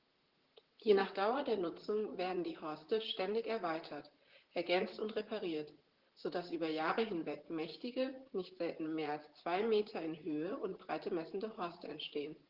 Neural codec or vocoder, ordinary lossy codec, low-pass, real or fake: vocoder, 44.1 kHz, 128 mel bands, Pupu-Vocoder; Opus, 16 kbps; 5.4 kHz; fake